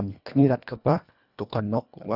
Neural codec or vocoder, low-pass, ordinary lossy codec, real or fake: codec, 24 kHz, 1.5 kbps, HILCodec; 5.4 kHz; none; fake